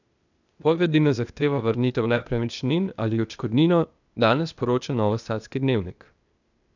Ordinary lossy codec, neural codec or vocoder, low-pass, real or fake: none; codec, 16 kHz, 0.8 kbps, ZipCodec; 7.2 kHz; fake